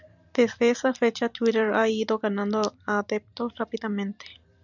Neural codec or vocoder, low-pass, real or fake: vocoder, 44.1 kHz, 128 mel bands every 256 samples, BigVGAN v2; 7.2 kHz; fake